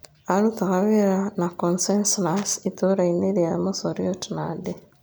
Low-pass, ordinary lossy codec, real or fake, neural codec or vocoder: none; none; real; none